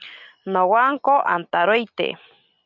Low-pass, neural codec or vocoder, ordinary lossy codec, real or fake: 7.2 kHz; none; MP3, 64 kbps; real